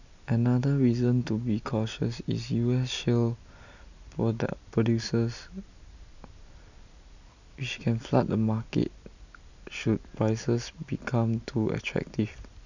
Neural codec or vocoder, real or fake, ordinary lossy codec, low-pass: none; real; none; 7.2 kHz